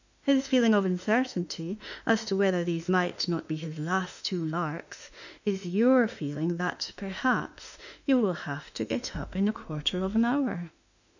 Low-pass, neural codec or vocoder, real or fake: 7.2 kHz; autoencoder, 48 kHz, 32 numbers a frame, DAC-VAE, trained on Japanese speech; fake